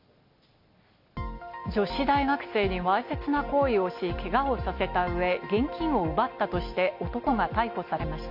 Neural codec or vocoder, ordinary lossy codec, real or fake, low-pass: none; MP3, 48 kbps; real; 5.4 kHz